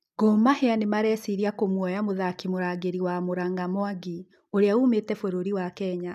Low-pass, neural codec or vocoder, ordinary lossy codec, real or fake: 14.4 kHz; none; none; real